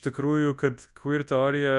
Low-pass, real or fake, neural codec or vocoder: 10.8 kHz; fake; codec, 24 kHz, 0.9 kbps, WavTokenizer, large speech release